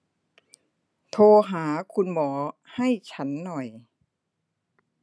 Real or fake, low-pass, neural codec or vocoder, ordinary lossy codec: real; none; none; none